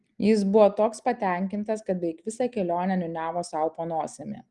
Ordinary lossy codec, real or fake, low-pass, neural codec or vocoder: Opus, 24 kbps; real; 10.8 kHz; none